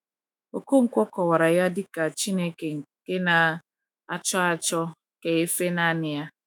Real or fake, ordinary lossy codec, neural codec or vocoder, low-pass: fake; none; autoencoder, 48 kHz, 128 numbers a frame, DAC-VAE, trained on Japanese speech; none